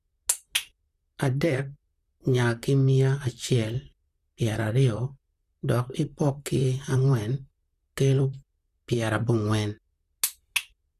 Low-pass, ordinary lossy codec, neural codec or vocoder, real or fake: 14.4 kHz; Opus, 64 kbps; vocoder, 44.1 kHz, 128 mel bands, Pupu-Vocoder; fake